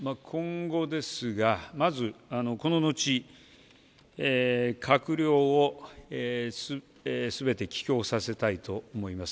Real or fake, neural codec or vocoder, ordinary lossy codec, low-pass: real; none; none; none